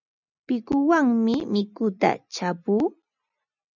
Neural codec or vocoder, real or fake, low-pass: none; real; 7.2 kHz